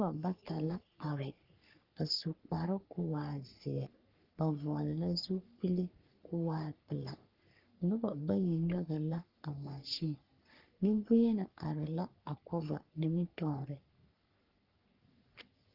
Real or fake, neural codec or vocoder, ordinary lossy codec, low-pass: fake; codec, 44.1 kHz, 3.4 kbps, Pupu-Codec; Opus, 32 kbps; 5.4 kHz